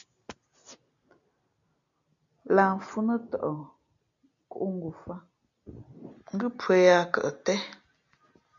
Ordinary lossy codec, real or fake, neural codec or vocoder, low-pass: MP3, 96 kbps; real; none; 7.2 kHz